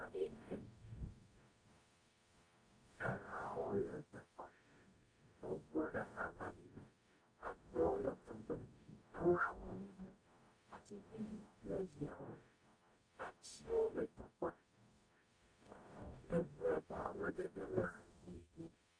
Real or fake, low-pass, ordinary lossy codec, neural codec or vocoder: fake; 9.9 kHz; AAC, 64 kbps; codec, 44.1 kHz, 0.9 kbps, DAC